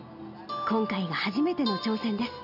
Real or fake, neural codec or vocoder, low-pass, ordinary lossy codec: real; none; 5.4 kHz; none